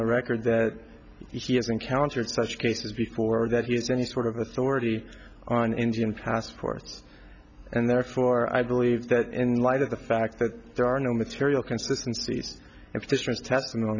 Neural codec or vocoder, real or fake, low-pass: none; real; 7.2 kHz